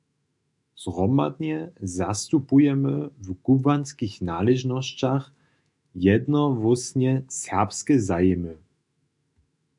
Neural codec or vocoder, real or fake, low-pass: autoencoder, 48 kHz, 128 numbers a frame, DAC-VAE, trained on Japanese speech; fake; 10.8 kHz